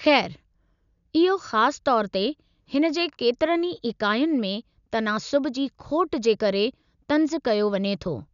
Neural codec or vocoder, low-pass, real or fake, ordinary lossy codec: none; 7.2 kHz; real; none